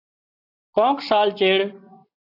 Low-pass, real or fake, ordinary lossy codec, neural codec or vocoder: 5.4 kHz; real; AAC, 24 kbps; none